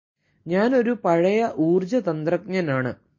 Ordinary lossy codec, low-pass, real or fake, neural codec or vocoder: MP3, 32 kbps; 7.2 kHz; real; none